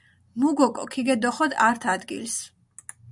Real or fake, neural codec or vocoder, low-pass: real; none; 10.8 kHz